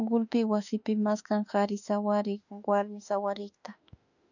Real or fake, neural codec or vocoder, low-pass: fake; autoencoder, 48 kHz, 32 numbers a frame, DAC-VAE, trained on Japanese speech; 7.2 kHz